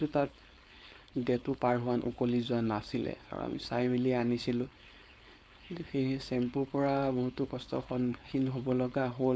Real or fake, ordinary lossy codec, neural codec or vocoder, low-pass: fake; none; codec, 16 kHz, 4.8 kbps, FACodec; none